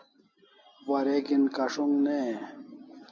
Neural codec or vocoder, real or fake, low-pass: none; real; 7.2 kHz